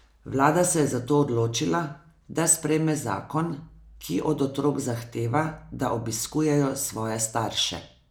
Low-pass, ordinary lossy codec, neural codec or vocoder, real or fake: none; none; none; real